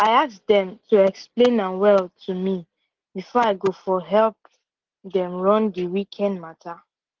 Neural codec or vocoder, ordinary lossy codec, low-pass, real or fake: none; Opus, 16 kbps; 7.2 kHz; real